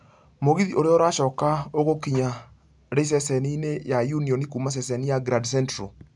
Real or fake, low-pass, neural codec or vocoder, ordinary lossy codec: real; 10.8 kHz; none; MP3, 96 kbps